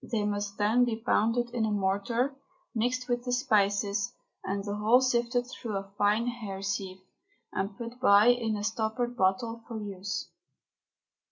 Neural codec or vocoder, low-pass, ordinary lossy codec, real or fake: none; 7.2 kHz; MP3, 64 kbps; real